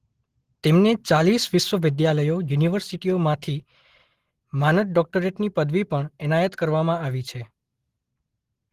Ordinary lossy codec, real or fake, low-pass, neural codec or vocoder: Opus, 16 kbps; real; 14.4 kHz; none